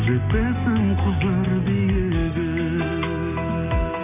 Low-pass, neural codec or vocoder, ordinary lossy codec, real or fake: 3.6 kHz; none; none; real